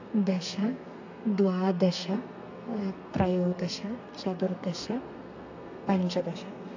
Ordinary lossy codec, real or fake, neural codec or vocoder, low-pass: AAC, 48 kbps; fake; codec, 32 kHz, 1.9 kbps, SNAC; 7.2 kHz